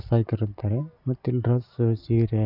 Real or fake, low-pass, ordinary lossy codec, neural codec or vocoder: real; 5.4 kHz; none; none